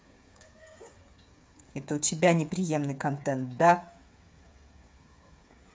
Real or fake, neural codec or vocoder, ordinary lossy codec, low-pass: fake; codec, 16 kHz, 8 kbps, FreqCodec, smaller model; none; none